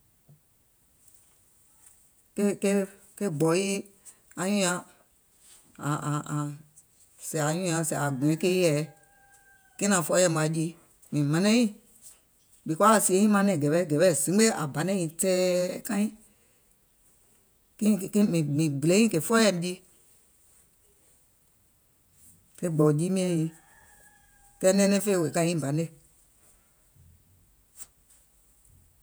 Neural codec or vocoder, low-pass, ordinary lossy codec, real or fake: vocoder, 48 kHz, 128 mel bands, Vocos; none; none; fake